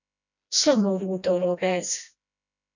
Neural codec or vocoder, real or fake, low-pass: codec, 16 kHz, 1 kbps, FreqCodec, smaller model; fake; 7.2 kHz